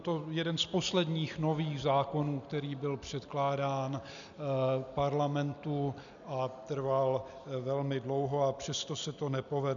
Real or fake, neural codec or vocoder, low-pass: real; none; 7.2 kHz